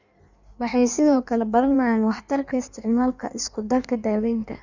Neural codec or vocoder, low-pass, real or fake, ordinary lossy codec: codec, 16 kHz in and 24 kHz out, 1.1 kbps, FireRedTTS-2 codec; 7.2 kHz; fake; none